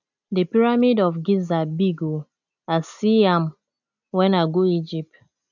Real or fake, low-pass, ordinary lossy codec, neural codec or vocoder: real; 7.2 kHz; none; none